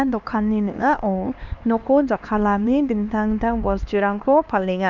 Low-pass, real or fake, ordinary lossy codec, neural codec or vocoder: 7.2 kHz; fake; none; codec, 16 kHz, 2 kbps, X-Codec, HuBERT features, trained on LibriSpeech